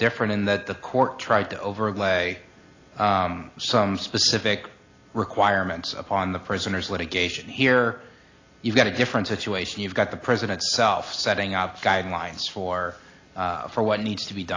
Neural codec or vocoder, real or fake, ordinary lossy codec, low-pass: none; real; AAC, 32 kbps; 7.2 kHz